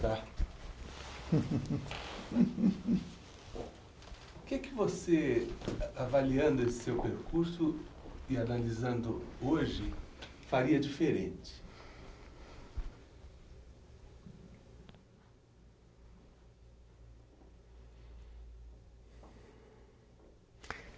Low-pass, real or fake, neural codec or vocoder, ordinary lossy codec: none; real; none; none